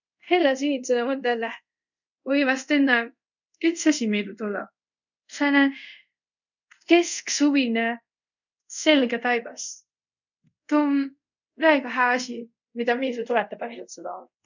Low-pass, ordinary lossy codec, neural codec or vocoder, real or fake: 7.2 kHz; none; codec, 24 kHz, 0.5 kbps, DualCodec; fake